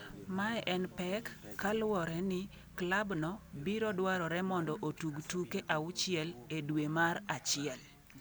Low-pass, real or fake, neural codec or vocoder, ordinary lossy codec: none; real; none; none